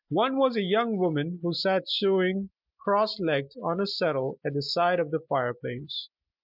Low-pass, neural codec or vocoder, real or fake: 5.4 kHz; none; real